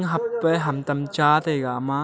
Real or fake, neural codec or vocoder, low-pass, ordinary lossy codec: real; none; none; none